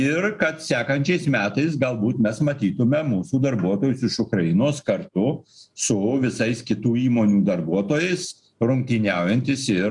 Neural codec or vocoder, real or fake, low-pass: none; real; 10.8 kHz